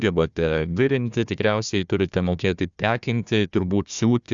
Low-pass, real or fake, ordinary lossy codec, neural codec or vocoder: 7.2 kHz; fake; Opus, 64 kbps; codec, 16 kHz, 1 kbps, FunCodec, trained on Chinese and English, 50 frames a second